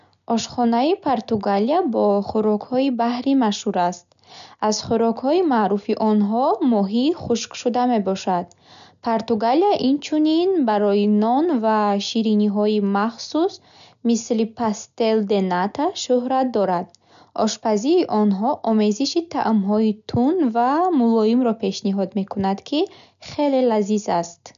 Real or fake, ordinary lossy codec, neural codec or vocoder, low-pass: real; none; none; 7.2 kHz